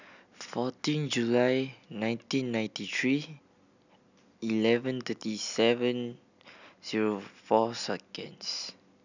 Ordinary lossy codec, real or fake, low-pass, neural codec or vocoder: none; real; 7.2 kHz; none